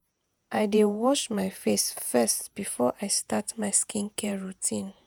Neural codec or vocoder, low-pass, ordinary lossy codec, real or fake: vocoder, 48 kHz, 128 mel bands, Vocos; none; none; fake